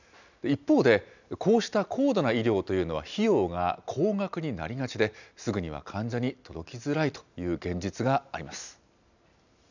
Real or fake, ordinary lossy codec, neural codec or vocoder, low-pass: real; none; none; 7.2 kHz